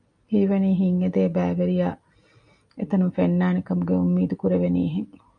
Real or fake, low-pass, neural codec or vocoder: real; 9.9 kHz; none